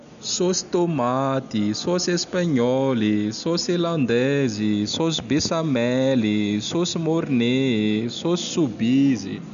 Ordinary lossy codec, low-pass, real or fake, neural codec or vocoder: none; 7.2 kHz; real; none